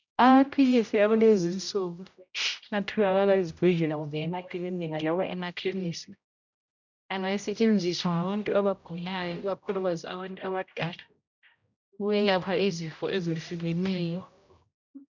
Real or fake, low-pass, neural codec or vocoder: fake; 7.2 kHz; codec, 16 kHz, 0.5 kbps, X-Codec, HuBERT features, trained on general audio